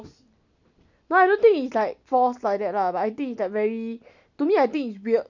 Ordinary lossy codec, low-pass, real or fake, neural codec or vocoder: none; 7.2 kHz; real; none